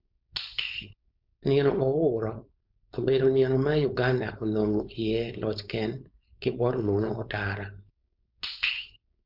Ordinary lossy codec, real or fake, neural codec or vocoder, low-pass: MP3, 48 kbps; fake; codec, 16 kHz, 4.8 kbps, FACodec; 5.4 kHz